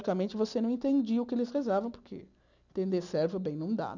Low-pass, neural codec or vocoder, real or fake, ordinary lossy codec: 7.2 kHz; none; real; none